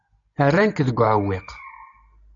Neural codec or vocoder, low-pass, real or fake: none; 7.2 kHz; real